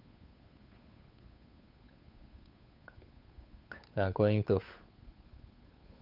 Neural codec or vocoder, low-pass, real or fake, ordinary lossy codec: codec, 16 kHz, 8 kbps, FunCodec, trained on Chinese and English, 25 frames a second; 5.4 kHz; fake; none